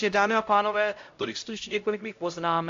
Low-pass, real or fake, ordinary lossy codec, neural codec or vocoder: 7.2 kHz; fake; AAC, 48 kbps; codec, 16 kHz, 0.5 kbps, X-Codec, HuBERT features, trained on LibriSpeech